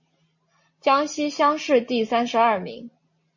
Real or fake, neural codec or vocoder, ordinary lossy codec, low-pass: real; none; MP3, 32 kbps; 7.2 kHz